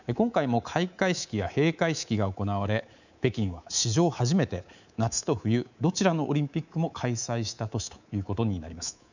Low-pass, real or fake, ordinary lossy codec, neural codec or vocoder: 7.2 kHz; fake; none; codec, 24 kHz, 3.1 kbps, DualCodec